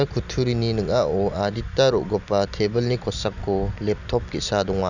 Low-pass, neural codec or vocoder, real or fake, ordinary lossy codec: 7.2 kHz; none; real; none